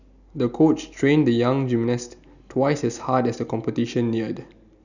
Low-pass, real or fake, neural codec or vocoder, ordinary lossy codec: 7.2 kHz; real; none; none